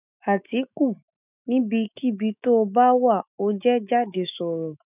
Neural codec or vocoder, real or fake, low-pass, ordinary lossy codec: autoencoder, 48 kHz, 128 numbers a frame, DAC-VAE, trained on Japanese speech; fake; 3.6 kHz; none